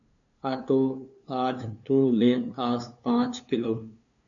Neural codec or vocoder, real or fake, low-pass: codec, 16 kHz, 2 kbps, FunCodec, trained on LibriTTS, 25 frames a second; fake; 7.2 kHz